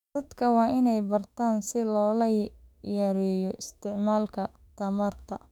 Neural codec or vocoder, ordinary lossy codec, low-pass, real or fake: autoencoder, 48 kHz, 32 numbers a frame, DAC-VAE, trained on Japanese speech; none; 19.8 kHz; fake